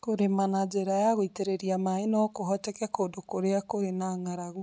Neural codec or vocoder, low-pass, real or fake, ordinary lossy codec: none; none; real; none